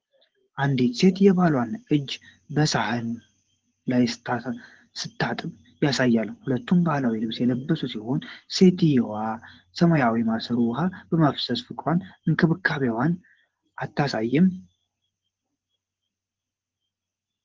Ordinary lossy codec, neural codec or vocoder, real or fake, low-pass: Opus, 16 kbps; vocoder, 24 kHz, 100 mel bands, Vocos; fake; 7.2 kHz